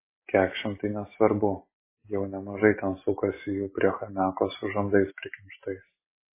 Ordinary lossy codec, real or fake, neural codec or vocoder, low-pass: MP3, 16 kbps; real; none; 3.6 kHz